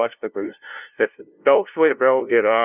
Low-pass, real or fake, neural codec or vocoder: 3.6 kHz; fake; codec, 16 kHz, 0.5 kbps, FunCodec, trained on LibriTTS, 25 frames a second